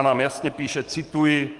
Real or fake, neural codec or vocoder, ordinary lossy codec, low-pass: fake; codec, 44.1 kHz, 7.8 kbps, DAC; Opus, 32 kbps; 10.8 kHz